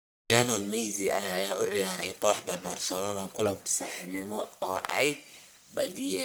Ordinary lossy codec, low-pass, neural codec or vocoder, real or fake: none; none; codec, 44.1 kHz, 1.7 kbps, Pupu-Codec; fake